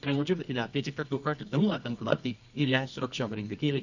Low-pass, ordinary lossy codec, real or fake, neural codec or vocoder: 7.2 kHz; none; fake; codec, 24 kHz, 0.9 kbps, WavTokenizer, medium music audio release